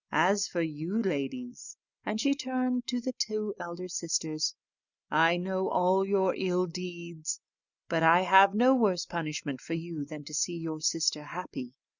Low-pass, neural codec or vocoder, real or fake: 7.2 kHz; none; real